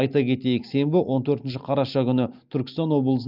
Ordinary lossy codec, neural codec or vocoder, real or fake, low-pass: Opus, 32 kbps; none; real; 5.4 kHz